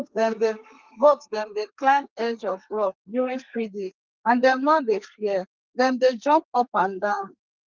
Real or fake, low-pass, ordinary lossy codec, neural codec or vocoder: fake; 7.2 kHz; Opus, 32 kbps; codec, 32 kHz, 1.9 kbps, SNAC